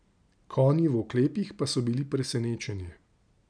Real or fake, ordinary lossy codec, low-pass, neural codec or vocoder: real; none; 9.9 kHz; none